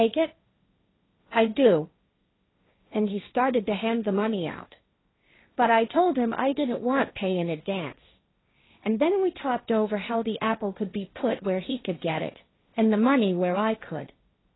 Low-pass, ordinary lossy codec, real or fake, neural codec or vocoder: 7.2 kHz; AAC, 16 kbps; fake; codec, 16 kHz, 1.1 kbps, Voila-Tokenizer